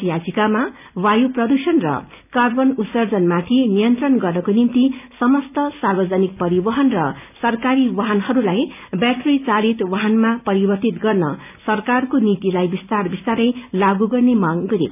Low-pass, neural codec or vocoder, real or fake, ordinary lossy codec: 3.6 kHz; none; real; none